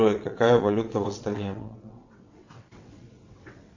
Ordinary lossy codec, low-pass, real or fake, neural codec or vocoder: AAC, 48 kbps; 7.2 kHz; fake; vocoder, 22.05 kHz, 80 mel bands, WaveNeXt